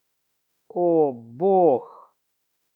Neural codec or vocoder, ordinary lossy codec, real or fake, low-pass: autoencoder, 48 kHz, 32 numbers a frame, DAC-VAE, trained on Japanese speech; none; fake; 19.8 kHz